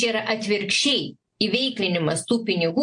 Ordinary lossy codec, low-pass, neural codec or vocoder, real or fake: AAC, 64 kbps; 9.9 kHz; none; real